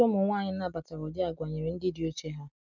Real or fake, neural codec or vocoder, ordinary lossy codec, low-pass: real; none; none; 7.2 kHz